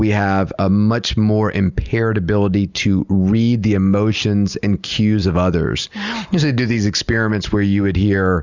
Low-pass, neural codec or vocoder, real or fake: 7.2 kHz; none; real